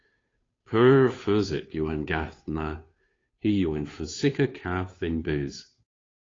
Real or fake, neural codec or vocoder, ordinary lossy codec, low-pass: fake; codec, 16 kHz, 2 kbps, FunCodec, trained on Chinese and English, 25 frames a second; AAC, 32 kbps; 7.2 kHz